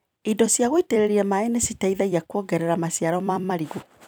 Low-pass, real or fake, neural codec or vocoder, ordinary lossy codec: none; fake; vocoder, 44.1 kHz, 128 mel bands every 256 samples, BigVGAN v2; none